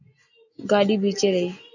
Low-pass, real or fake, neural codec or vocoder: 7.2 kHz; real; none